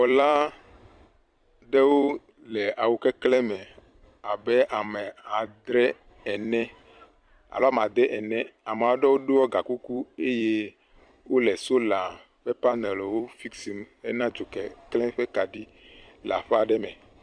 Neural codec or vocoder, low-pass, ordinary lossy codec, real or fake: vocoder, 44.1 kHz, 128 mel bands every 256 samples, BigVGAN v2; 9.9 kHz; Opus, 64 kbps; fake